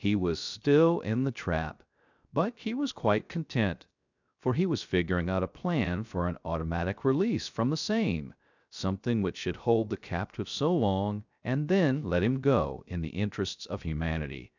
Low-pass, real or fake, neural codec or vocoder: 7.2 kHz; fake; codec, 16 kHz, 0.3 kbps, FocalCodec